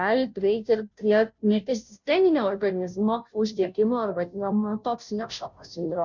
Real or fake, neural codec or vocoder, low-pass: fake; codec, 16 kHz, 0.5 kbps, FunCodec, trained on Chinese and English, 25 frames a second; 7.2 kHz